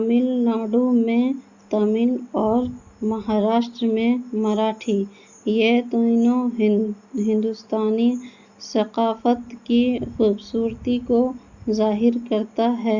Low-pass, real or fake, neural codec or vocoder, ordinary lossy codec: 7.2 kHz; real; none; Opus, 64 kbps